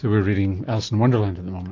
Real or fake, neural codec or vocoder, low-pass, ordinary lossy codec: real; none; 7.2 kHz; AAC, 48 kbps